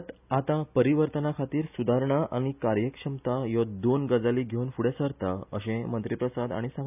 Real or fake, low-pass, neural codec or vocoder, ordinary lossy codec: real; 3.6 kHz; none; none